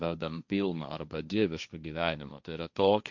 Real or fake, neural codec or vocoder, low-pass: fake; codec, 16 kHz, 1.1 kbps, Voila-Tokenizer; 7.2 kHz